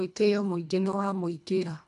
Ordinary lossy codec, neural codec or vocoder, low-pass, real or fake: MP3, 96 kbps; codec, 24 kHz, 1.5 kbps, HILCodec; 10.8 kHz; fake